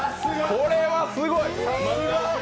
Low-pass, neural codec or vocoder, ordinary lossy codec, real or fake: none; none; none; real